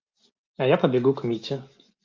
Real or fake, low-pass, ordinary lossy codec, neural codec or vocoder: real; 7.2 kHz; Opus, 24 kbps; none